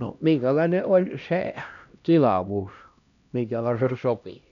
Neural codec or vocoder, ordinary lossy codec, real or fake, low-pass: codec, 16 kHz, 1 kbps, X-Codec, HuBERT features, trained on LibriSpeech; none; fake; 7.2 kHz